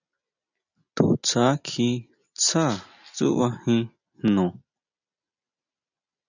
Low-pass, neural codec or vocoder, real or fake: 7.2 kHz; none; real